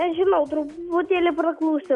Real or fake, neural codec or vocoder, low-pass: real; none; 10.8 kHz